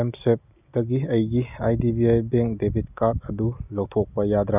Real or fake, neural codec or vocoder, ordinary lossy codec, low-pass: real; none; none; 3.6 kHz